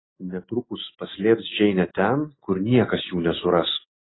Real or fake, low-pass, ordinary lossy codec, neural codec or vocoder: real; 7.2 kHz; AAC, 16 kbps; none